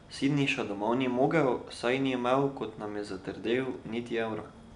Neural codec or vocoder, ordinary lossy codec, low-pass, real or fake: none; none; 10.8 kHz; real